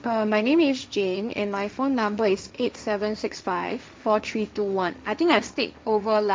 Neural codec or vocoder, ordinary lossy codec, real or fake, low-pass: codec, 16 kHz, 1.1 kbps, Voila-Tokenizer; none; fake; none